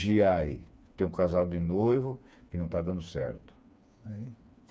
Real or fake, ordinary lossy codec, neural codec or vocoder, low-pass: fake; none; codec, 16 kHz, 4 kbps, FreqCodec, smaller model; none